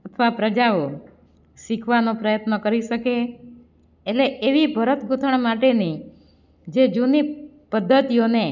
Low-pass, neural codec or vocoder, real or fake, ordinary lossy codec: 7.2 kHz; none; real; none